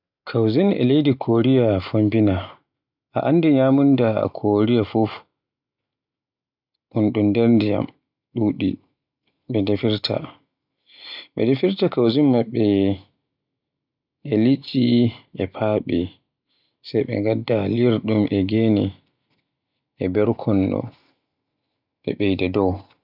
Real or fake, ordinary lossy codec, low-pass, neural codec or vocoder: real; none; 5.4 kHz; none